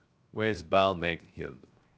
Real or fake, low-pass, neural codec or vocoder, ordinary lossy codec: fake; none; codec, 16 kHz, 0.7 kbps, FocalCodec; none